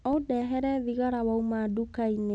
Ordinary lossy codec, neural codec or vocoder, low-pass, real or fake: none; none; 9.9 kHz; real